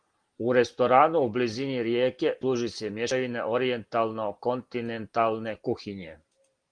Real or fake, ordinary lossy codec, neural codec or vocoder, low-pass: real; Opus, 24 kbps; none; 9.9 kHz